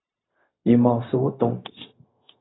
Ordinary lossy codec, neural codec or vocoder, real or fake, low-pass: AAC, 16 kbps; codec, 16 kHz, 0.4 kbps, LongCat-Audio-Codec; fake; 7.2 kHz